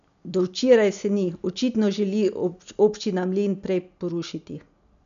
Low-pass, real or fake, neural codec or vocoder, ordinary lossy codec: 7.2 kHz; real; none; none